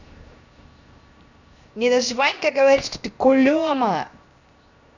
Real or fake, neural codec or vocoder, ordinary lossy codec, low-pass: fake; codec, 16 kHz, 0.7 kbps, FocalCodec; none; 7.2 kHz